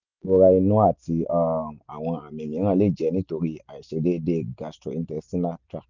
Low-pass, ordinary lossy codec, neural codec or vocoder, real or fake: 7.2 kHz; none; none; real